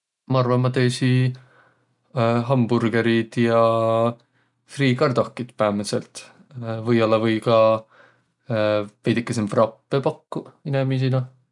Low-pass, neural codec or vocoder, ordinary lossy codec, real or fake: 10.8 kHz; none; none; real